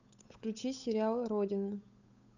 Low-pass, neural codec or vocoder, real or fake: 7.2 kHz; codec, 16 kHz, 8 kbps, FunCodec, trained on Chinese and English, 25 frames a second; fake